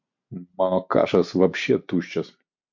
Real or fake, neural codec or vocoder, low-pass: real; none; 7.2 kHz